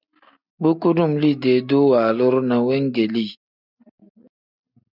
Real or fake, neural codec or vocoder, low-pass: real; none; 5.4 kHz